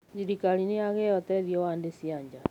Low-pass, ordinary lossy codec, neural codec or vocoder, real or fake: 19.8 kHz; none; none; real